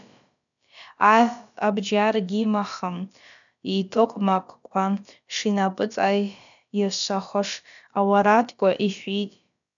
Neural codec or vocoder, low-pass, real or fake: codec, 16 kHz, about 1 kbps, DyCAST, with the encoder's durations; 7.2 kHz; fake